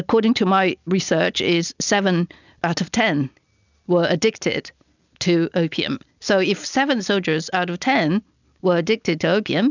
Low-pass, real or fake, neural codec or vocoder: 7.2 kHz; real; none